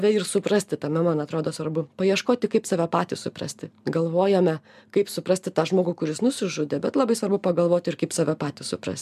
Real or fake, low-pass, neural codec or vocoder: real; 14.4 kHz; none